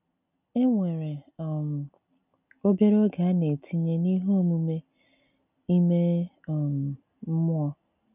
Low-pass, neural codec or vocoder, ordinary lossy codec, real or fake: 3.6 kHz; none; none; real